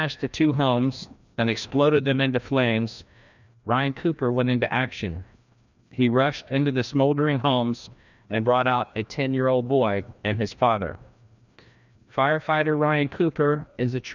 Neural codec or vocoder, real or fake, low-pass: codec, 16 kHz, 1 kbps, FreqCodec, larger model; fake; 7.2 kHz